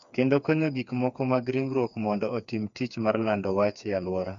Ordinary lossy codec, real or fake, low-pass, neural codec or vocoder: AAC, 64 kbps; fake; 7.2 kHz; codec, 16 kHz, 4 kbps, FreqCodec, smaller model